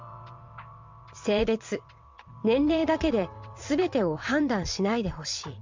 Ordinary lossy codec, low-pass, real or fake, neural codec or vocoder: MP3, 64 kbps; 7.2 kHz; fake; vocoder, 22.05 kHz, 80 mel bands, WaveNeXt